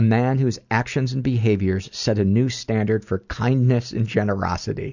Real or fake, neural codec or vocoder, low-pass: real; none; 7.2 kHz